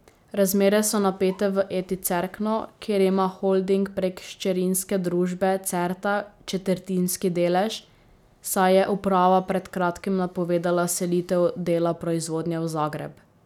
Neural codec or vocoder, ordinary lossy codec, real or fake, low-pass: none; none; real; 19.8 kHz